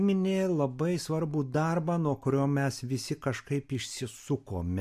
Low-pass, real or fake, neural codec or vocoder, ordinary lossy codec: 14.4 kHz; real; none; MP3, 64 kbps